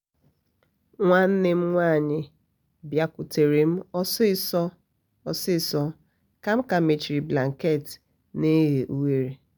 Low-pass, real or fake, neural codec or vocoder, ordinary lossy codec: none; real; none; none